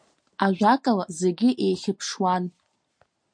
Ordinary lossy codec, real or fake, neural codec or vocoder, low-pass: MP3, 48 kbps; real; none; 9.9 kHz